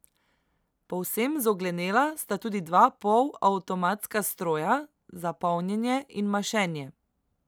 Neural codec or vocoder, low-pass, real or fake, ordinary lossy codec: none; none; real; none